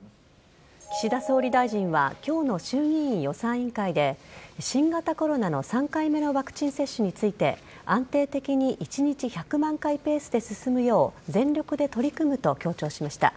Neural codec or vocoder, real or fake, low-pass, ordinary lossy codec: none; real; none; none